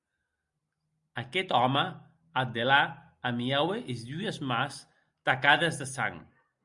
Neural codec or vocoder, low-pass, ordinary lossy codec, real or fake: none; 10.8 kHz; Opus, 64 kbps; real